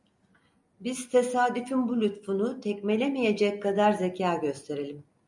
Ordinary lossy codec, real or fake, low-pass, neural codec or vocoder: MP3, 96 kbps; real; 10.8 kHz; none